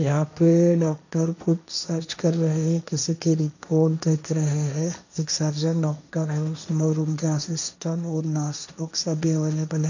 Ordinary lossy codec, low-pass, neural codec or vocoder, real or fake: none; 7.2 kHz; codec, 16 kHz, 1.1 kbps, Voila-Tokenizer; fake